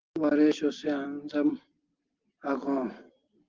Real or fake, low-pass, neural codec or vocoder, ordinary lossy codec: real; 7.2 kHz; none; Opus, 16 kbps